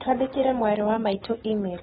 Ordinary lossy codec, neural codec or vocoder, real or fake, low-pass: AAC, 16 kbps; none; real; 7.2 kHz